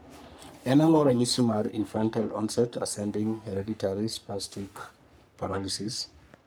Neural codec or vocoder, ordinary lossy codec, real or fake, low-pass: codec, 44.1 kHz, 3.4 kbps, Pupu-Codec; none; fake; none